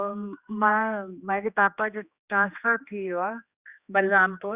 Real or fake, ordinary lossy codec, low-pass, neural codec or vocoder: fake; Opus, 64 kbps; 3.6 kHz; codec, 16 kHz, 2 kbps, X-Codec, HuBERT features, trained on general audio